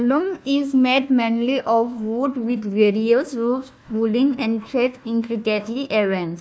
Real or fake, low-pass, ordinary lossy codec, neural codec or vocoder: fake; none; none; codec, 16 kHz, 1 kbps, FunCodec, trained on Chinese and English, 50 frames a second